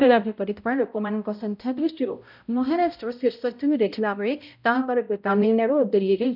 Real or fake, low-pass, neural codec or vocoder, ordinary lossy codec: fake; 5.4 kHz; codec, 16 kHz, 0.5 kbps, X-Codec, HuBERT features, trained on balanced general audio; none